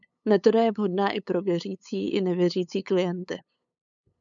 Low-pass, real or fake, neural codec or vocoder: 7.2 kHz; fake; codec, 16 kHz, 8 kbps, FunCodec, trained on LibriTTS, 25 frames a second